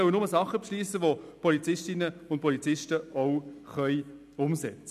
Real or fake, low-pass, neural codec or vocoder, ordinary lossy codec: real; 14.4 kHz; none; none